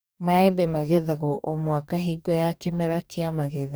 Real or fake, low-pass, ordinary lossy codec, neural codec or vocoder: fake; none; none; codec, 44.1 kHz, 2.6 kbps, DAC